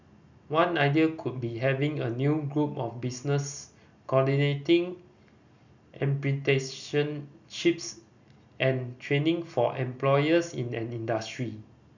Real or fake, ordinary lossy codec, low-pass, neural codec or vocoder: real; none; 7.2 kHz; none